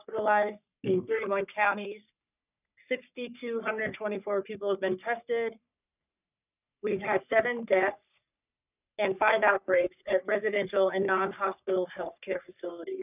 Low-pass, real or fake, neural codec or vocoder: 3.6 kHz; fake; codec, 44.1 kHz, 3.4 kbps, Pupu-Codec